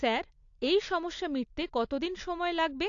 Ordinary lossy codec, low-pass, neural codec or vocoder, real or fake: AAC, 48 kbps; 7.2 kHz; none; real